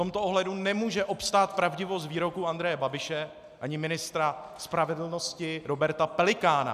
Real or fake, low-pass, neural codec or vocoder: real; 14.4 kHz; none